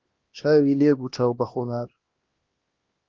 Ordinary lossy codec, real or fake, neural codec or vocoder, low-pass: Opus, 16 kbps; fake; codec, 16 kHz, 1 kbps, X-Codec, HuBERT features, trained on LibriSpeech; 7.2 kHz